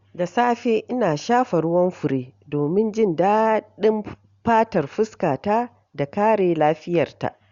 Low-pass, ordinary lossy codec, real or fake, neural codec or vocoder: 7.2 kHz; Opus, 64 kbps; real; none